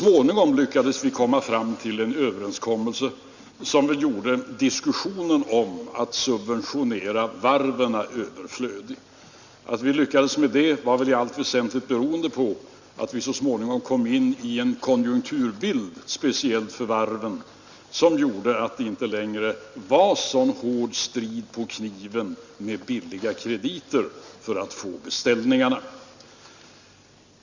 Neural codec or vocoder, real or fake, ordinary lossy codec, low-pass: none; real; Opus, 64 kbps; 7.2 kHz